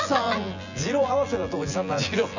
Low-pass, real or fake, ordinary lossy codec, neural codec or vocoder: 7.2 kHz; fake; none; vocoder, 24 kHz, 100 mel bands, Vocos